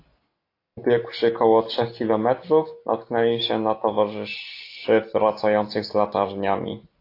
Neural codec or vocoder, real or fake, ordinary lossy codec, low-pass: none; real; AAC, 32 kbps; 5.4 kHz